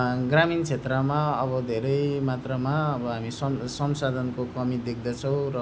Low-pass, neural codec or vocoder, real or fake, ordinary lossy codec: none; none; real; none